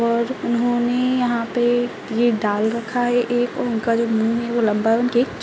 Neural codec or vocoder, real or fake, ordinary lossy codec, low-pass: none; real; none; none